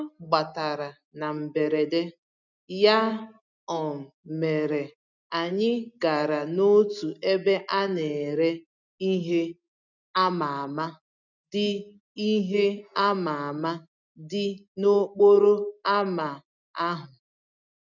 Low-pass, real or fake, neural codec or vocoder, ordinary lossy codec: 7.2 kHz; real; none; none